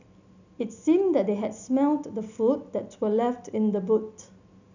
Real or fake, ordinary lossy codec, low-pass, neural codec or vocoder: real; none; 7.2 kHz; none